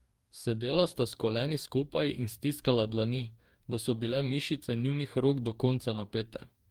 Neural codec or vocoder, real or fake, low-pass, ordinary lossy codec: codec, 44.1 kHz, 2.6 kbps, DAC; fake; 19.8 kHz; Opus, 32 kbps